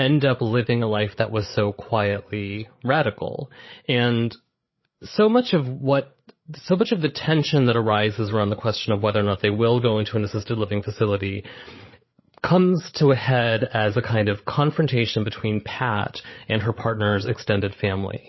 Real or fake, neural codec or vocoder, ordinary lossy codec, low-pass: fake; codec, 16 kHz, 8 kbps, FreqCodec, larger model; MP3, 24 kbps; 7.2 kHz